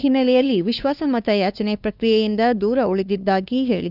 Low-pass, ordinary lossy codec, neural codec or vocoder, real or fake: 5.4 kHz; none; codec, 16 kHz, 2 kbps, FunCodec, trained on LibriTTS, 25 frames a second; fake